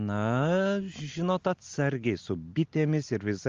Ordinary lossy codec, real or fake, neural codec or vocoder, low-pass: Opus, 32 kbps; real; none; 7.2 kHz